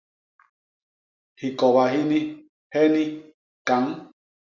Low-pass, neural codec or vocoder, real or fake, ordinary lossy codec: 7.2 kHz; none; real; Opus, 64 kbps